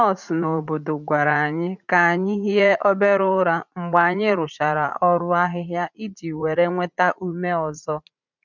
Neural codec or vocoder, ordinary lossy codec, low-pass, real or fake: vocoder, 44.1 kHz, 80 mel bands, Vocos; none; 7.2 kHz; fake